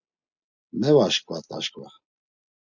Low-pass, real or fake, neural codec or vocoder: 7.2 kHz; real; none